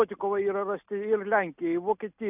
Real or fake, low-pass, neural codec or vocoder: real; 3.6 kHz; none